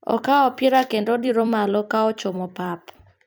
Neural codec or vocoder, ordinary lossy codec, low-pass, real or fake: vocoder, 44.1 kHz, 128 mel bands every 256 samples, BigVGAN v2; none; none; fake